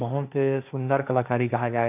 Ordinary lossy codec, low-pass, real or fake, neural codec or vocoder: none; 3.6 kHz; fake; codec, 16 kHz, 1.1 kbps, Voila-Tokenizer